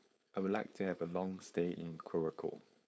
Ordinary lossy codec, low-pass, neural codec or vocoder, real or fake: none; none; codec, 16 kHz, 4.8 kbps, FACodec; fake